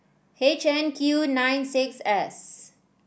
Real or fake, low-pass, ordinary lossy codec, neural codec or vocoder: real; none; none; none